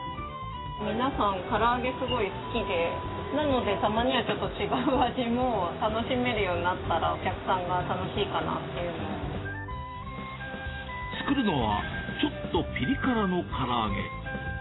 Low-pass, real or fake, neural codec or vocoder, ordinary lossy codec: 7.2 kHz; real; none; AAC, 16 kbps